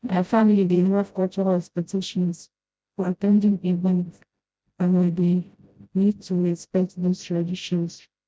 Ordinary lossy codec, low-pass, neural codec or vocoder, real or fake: none; none; codec, 16 kHz, 0.5 kbps, FreqCodec, smaller model; fake